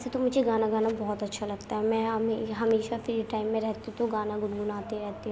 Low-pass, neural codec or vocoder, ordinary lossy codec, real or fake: none; none; none; real